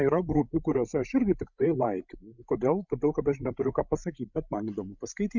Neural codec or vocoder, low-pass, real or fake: codec, 16 kHz, 16 kbps, FreqCodec, larger model; 7.2 kHz; fake